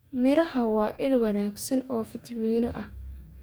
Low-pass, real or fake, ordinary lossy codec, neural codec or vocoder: none; fake; none; codec, 44.1 kHz, 2.6 kbps, DAC